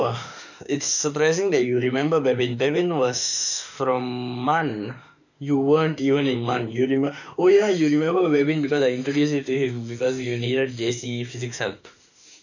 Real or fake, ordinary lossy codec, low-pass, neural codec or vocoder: fake; none; 7.2 kHz; autoencoder, 48 kHz, 32 numbers a frame, DAC-VAE, trained on Japanese speech